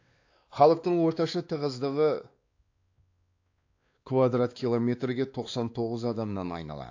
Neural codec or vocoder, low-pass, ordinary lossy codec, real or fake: codec, 16 kHz, 2 kbps, X-Codec, WavLM features, trained on Multilingual LibriSpeech; 7.2 kHz; AAC, 48 kbps; fake